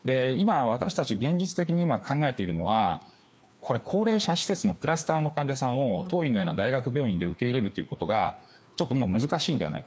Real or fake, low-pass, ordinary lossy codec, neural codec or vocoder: fake; none; none; codec, 16 kHz, 2 kbps, FreqCodec, larger model